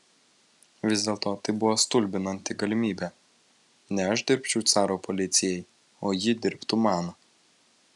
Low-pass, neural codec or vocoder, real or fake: 10.8 kHz; none; real